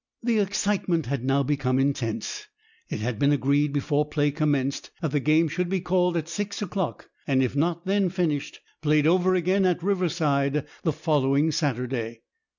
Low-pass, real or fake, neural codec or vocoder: 7.2 kHz; real; none